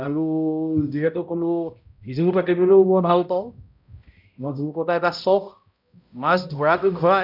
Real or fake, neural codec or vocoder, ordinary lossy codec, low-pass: fake; codec, 16 kHz, 0.5 kbps, X-Codec, HuBERT features, trained on balanced general audio; none; 5.4 kHz